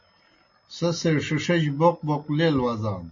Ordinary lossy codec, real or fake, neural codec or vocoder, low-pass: MP3, 32 kbps; real; none; 7.2 kHz